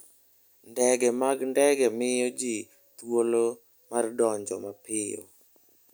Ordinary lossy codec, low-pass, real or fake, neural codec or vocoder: none; none; real; none